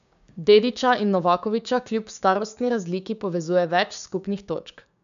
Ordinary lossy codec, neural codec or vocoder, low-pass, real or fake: AAC, 96 kbps; codec, 16 kHz, 6 kbps, DAC; 7.2 kHz; fake